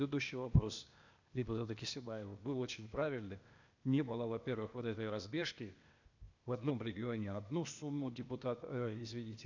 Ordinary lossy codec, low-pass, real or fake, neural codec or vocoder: none; 7.2 kHz; fake; codec, 16 kHz, 0.8 kbps, ZipCodec